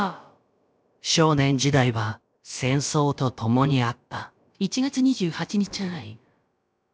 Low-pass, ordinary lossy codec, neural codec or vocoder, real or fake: none; none; codec, 16 kHz, about 1 kbps, DyCAST, with the encoder's durations; fake